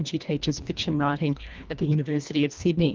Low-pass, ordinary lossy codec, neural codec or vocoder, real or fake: 7.2 kHz; Opus, 24 kbps; codec, 24 kHz, 1.5 kbps, HILCodec; fake